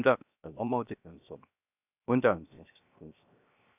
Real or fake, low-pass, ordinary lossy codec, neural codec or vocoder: fake; 3.6 kHz; none; codec, 16 kHz, 0.7 kbps, FocalCodec